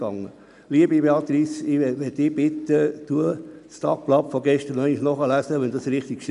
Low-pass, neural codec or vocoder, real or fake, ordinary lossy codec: 10.8 kHz; none; real; none